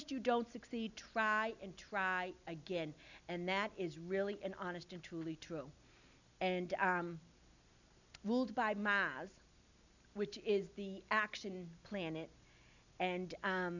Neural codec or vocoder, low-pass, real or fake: none; 7.2 kHz; real